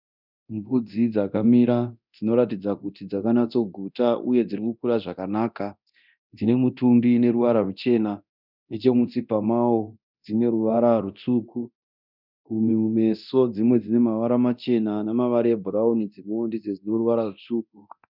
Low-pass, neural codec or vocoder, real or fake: 5.4 kHz; codec, 24 kHz, 0.9 kbps, DualCodec; fake